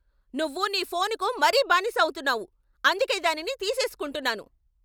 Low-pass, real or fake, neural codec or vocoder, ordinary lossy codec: none; real; none; none